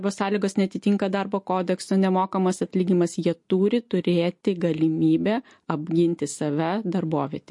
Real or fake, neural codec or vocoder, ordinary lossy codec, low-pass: real; none; MP3, 48 kbps; 10.8 kHz